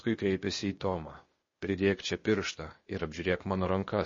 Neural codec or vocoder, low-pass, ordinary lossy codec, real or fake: codec, 16 kHz, 0.8 kbps, ZipCodec; 7.2 kHz; MP3, 32 kbps; fake